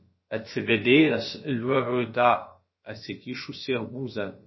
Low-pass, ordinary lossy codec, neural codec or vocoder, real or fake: 7.2 kHz; MP3, 24 kbps; codec, 16 kHz, about 1 kbps, DyCAST, with the encoder's durations; fake